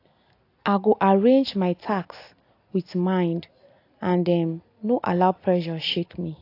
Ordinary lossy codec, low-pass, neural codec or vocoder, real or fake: AAC, 32 kbps; 5.4 kHz; none; real